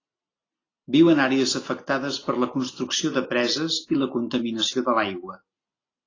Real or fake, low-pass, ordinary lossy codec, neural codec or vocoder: real; 7.2 kHz; AAC, 32 kbps; none